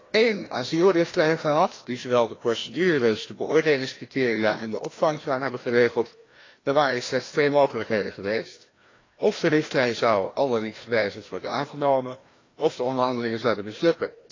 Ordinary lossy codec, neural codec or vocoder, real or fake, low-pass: AAC, 32 kbps; codec, 16 kHz, 1 kbps, FreqCodec, larger model; fake; 7.2 kHz